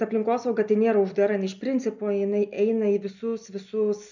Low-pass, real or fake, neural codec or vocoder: 7.2 kHz; real; none